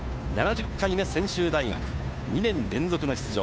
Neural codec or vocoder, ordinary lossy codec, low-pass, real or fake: codec, 16 kHz, 2 kbps, FunCodec, trained on Chinese and English, 25 frames a second; none; none; fake